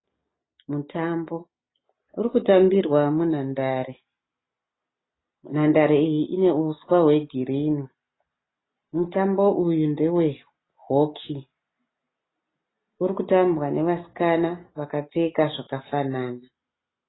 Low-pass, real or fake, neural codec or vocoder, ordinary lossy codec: 7.2 kHz; real; none; AAC, 16 kbps